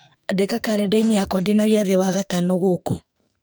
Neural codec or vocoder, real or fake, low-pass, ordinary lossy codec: codec, 44.1 kHz, 2.6 kbps, SNAC; fake; none; none